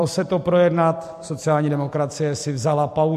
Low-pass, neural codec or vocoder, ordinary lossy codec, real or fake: 14.4 kHz; vocoder, 44.1 kHz, 128 mel bands every 256 samples, BigVGAN v2; MP3, 64 kbps; fake